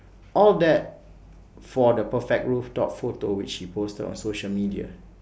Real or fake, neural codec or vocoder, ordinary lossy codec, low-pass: real; none; none; none